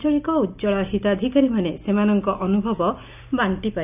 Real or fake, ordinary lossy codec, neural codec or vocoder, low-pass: real; none; none; 3.6 kHz